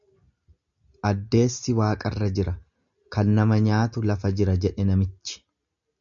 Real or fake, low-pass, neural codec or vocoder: real; 7.2 kHz; none